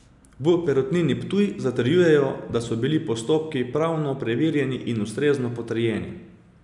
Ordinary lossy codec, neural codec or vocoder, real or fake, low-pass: none; none; real; 10.8 kHz